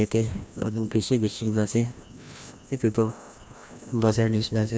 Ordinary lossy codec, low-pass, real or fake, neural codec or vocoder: none; none; fake; codec, 16 kHz, 1 kbps, FreqCodec, larger model